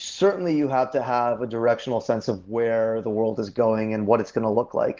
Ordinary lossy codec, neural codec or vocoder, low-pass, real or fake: Opus, 16 kbps; none; 7.2 kHz; real